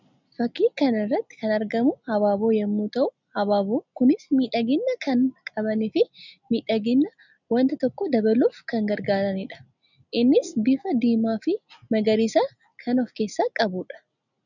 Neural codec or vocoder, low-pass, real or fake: none; 7.2 kHz; real